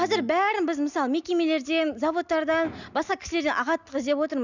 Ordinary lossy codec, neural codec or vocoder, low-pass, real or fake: none; none; 7.2 kHz; real